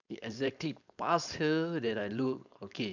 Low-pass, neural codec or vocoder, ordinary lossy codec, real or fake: 7.2 kHz; codec, 16 kHz, 4.8 kbps, FACodec; none; fake